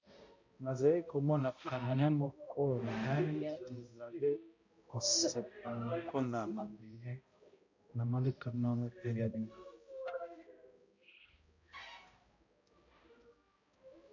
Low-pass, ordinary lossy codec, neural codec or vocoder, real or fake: 7.2 kHz; MP3, 48 kbps; codec, 16 kHz, 0.5 kbps, X-Codec, HuBERT features, trained on balanced general audio; fake